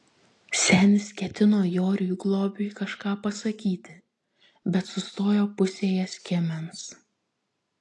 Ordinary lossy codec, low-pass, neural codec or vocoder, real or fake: AAC, 48 kbps; 10.8 kHz; none; real